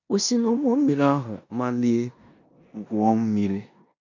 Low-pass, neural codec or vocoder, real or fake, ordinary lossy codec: 7.2 kHz; codec, 16 kHz in and 24 kHz out, 0.9 kbps, LongCat-Audio-Codec, four codebook decoder; fake; none